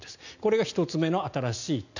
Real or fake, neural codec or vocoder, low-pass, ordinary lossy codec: real; none; 7.2 kHz; none